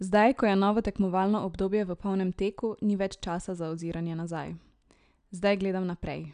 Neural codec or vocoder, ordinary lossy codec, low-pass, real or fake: none; none; 9.9 kHz; real